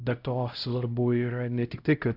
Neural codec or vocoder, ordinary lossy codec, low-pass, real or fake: codec, 16 kHz, 0.5 kbps, X-Codec, HuBERT features, trained on LibriSpeech; Opus, 64 kbps; 5.4 kHz; fake